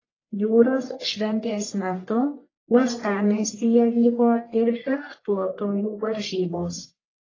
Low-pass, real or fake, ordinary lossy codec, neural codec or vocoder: 7.2 kHz; fake; AAC, 32 kbps; codec, 44.1 kHz, 1.7 kbps, Pupu-Codec